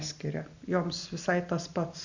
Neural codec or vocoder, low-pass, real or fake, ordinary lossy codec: none; 7.2 kHz; real; Opus, 64 kbps